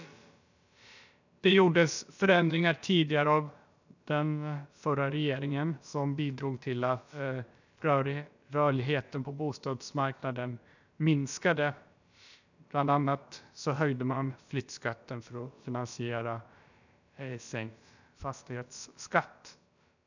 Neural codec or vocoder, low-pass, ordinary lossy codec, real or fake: codec, 16 kHz, about 1 kbps, DyCAST, with the encoder's durations; 7.2 kHz; none; fake